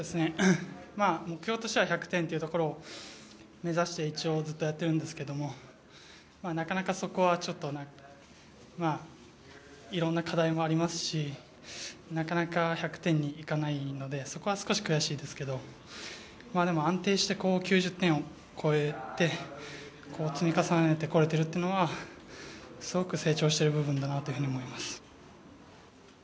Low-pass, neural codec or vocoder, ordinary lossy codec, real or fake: none; none; none; real